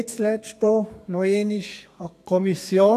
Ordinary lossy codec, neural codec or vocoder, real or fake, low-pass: AAC, 64 kbps; codec, 32 kHz, 1.9 kbps, SNAC; fake; 14.4 kHz